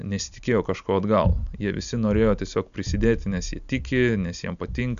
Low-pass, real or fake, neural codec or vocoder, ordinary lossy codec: 7.2 kHz; real; none; AAC, 96 kbps